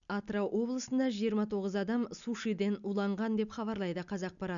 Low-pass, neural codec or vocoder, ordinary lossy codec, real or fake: 7.2 kHz; none; none; real